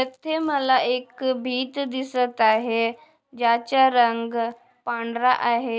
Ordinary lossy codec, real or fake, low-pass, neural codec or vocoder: none; real; none; none